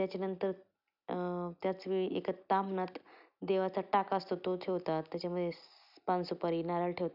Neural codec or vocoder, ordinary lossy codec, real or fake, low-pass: none; none; real; 5.4 kHz